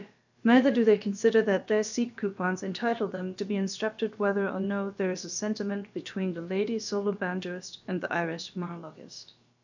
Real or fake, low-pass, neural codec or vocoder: fake; 7.2 kHz; codec, 16 kHz, about 1 kbps, DyCAST, with the encoder's durations